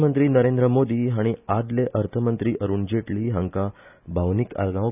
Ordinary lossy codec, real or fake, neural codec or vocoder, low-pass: none; real; none; 3.6 kHz